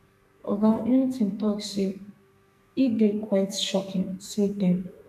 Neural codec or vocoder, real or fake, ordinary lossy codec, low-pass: codec, 44.1 kHz, 2.6 kbps, SNAC; fake; none; 14.4 kHz